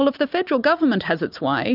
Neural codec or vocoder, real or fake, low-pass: none; real; 5.4 kHz